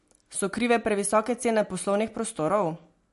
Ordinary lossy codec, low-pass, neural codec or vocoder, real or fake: MP3, 48 kbps; 14.4 kHz; none; real